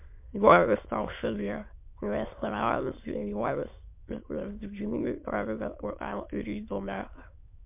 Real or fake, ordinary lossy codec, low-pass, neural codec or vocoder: fake; MP3, 32 kbps; 3.6 kHz; autoencoder, 22.05 kHz, a latent of 192 numbers a frame, VITS, trained on many speakers